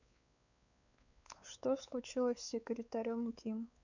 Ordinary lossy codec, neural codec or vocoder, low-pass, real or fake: none; codec, 16 kHz, 4 kbps, X-Codec, WavLM features, trained on Multilingual LibriSpeech; 7.2 kHz; fake